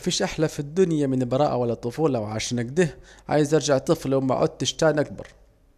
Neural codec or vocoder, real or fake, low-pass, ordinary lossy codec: none; real; 14.4 kHz; none